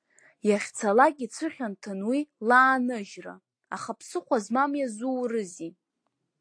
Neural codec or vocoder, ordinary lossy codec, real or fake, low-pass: none; AAC, 48 kbps; real; 9.9 kHz